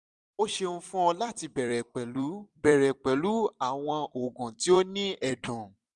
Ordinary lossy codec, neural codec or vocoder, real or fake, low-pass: none; vocoder, 44.1 kHz, 128 mel bands every 256 samples, BigVGAN v2; fake; 10.8 kHz